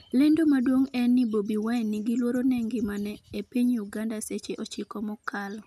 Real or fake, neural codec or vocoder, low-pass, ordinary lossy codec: real; none; 14.4 kHz; none